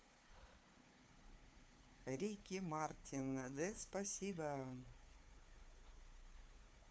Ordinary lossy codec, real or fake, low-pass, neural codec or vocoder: none; fake; none; codec, 16 kHz, 4 kbps, FunCodec, trained on Chinese and English, 50 frames a second